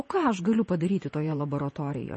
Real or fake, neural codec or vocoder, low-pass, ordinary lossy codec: fake; vocoder, 48 kHz, 128 mel bands, Vocos; 10.8 kHz; MP3, 32 kbps